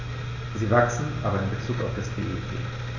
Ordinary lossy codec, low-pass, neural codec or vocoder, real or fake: none; 7.2 kHz; none; real